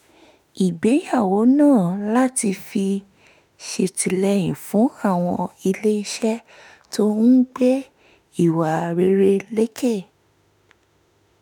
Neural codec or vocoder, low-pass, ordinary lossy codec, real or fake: autoencoder, 48 kHz, 32 numbers a frame, DAC-VAE, trained on Japanese speech; none; none; fake